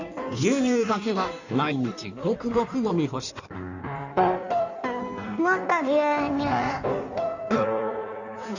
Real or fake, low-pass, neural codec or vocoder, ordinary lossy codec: fake; 7.2 kHz; codec, 16 kHz in and 24 kHz out, 1.1 kbps, FireRedTTS-2 codec; none